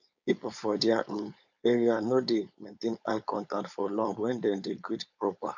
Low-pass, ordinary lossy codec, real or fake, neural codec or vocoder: 7.2 kHz; none; fake; codec, 16 kHz, 4.8 kbps, FACodec